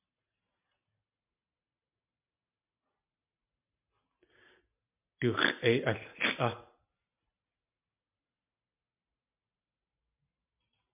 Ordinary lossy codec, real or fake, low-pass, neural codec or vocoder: MP3, 32 kbps; real; 3.6 kHz; none